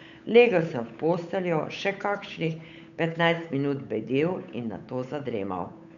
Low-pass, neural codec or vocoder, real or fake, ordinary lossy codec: 7.2 kHz; codec, 16 kHz, 8 kbps, FunCodec, trained on Chinese and English, 25 frames a second; fake; none